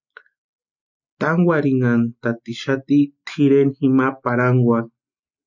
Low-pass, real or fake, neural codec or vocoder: 7.2 kHz; real; none